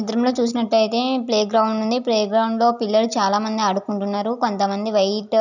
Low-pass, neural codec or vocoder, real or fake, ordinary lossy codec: 7.2 kHz; none; real; none